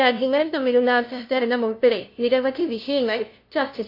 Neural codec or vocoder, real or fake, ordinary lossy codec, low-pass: codec, 16 kHz, 0.5 kbps, FunCodec, trained on LibriTTS, 25 frames a second; fake; AAC, 32 kbps; 5.4 kHz